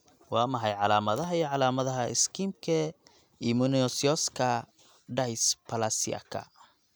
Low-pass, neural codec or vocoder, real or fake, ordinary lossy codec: none; none; real; none